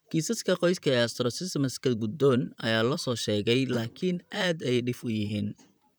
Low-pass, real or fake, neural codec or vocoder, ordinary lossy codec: none; fake; vocoder, 44.1 kHz, 128 mel bands, Pupu-Vocoder; none